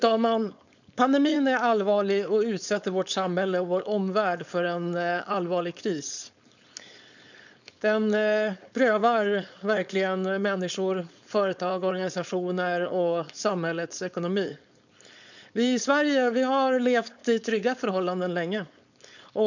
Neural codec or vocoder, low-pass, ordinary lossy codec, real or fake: codec, 16 kHz, 4.8 kbps, FACodec; 7.2 kHz; none; fake